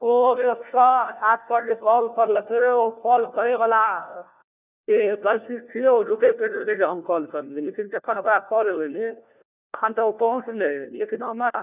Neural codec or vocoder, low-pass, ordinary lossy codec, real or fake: codec, 16 kHz, 1 kbps, FunCodec, trained on LibriTTS, 50 frames a second; 3.6 kHz; none; fake